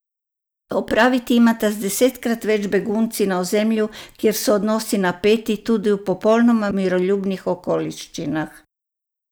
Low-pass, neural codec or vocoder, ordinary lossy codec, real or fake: none; none; none; real